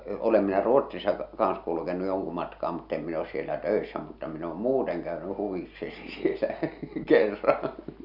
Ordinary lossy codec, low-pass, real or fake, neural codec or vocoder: none; 5.4 kHz; real; none